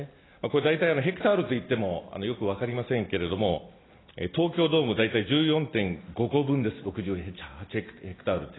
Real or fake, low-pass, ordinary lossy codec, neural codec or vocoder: real; 7.2 kHz; AAC, 16 kbps; none